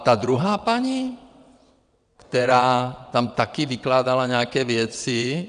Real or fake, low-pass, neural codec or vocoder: fake; 9.9 kHz; vocoder, 22.05 kHz, 80 mel bands, WaveNeXt